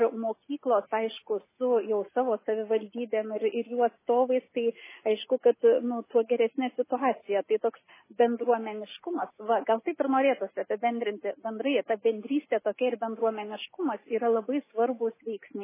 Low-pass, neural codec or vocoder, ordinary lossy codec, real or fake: 3.6 kHz; none; MP3, 16 kbps; real